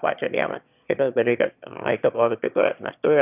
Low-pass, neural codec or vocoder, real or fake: 3.6 kHz; autoencoder, 22.05 kHz, a latent of 192 numbers a frame, VITS, trained on one speaker; fake